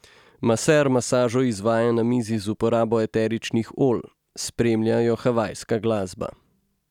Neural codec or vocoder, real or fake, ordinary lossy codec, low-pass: none; real; none; 19.8 kHz